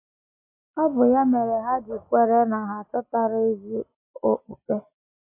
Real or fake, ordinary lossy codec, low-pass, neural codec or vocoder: real; MP3, 24 kbps; 3.6 kHz; none